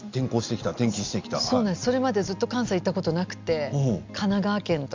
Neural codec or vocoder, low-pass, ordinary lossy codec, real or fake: none; 7.2 kHz; MP3, 64 kbps; real